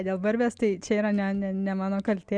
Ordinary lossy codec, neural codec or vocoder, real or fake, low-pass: Opus, 64 kbps; none; real; 9.9 kHz